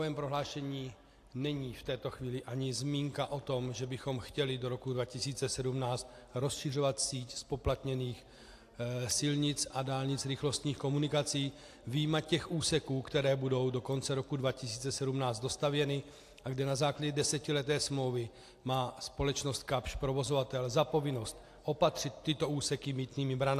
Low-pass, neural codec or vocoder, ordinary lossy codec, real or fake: 14.4 kHz; none; AAC, 64 kbps; real